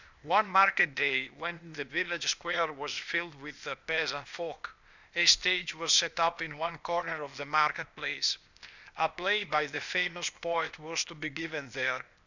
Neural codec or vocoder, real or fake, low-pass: codec, 16 kHz, 0.8 kbps, ZipCodec; fake; 7.2 kHz